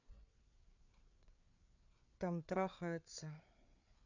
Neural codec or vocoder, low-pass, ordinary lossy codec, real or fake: codec, 16 kHz, 4 kbps, FreqCodec, larger model; 7.2 kHz; none; fake